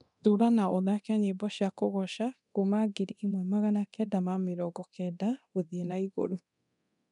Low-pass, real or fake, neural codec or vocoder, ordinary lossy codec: 10.8 kHz; fake; codec, 24 kHz, 0.9 kbps, DualCodec; none